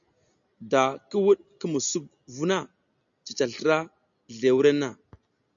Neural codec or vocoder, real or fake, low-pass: none; real; 7.2 kHz